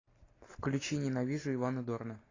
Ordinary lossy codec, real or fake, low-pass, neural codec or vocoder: AAC, 32 kbps; real; 7.2 kHz; none